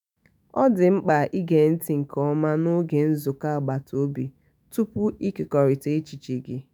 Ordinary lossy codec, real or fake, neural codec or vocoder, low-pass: none; fake; autoencoder, 48 kHz, 128 numbers a frame, DAC-VAE, trained on Japanese speech; none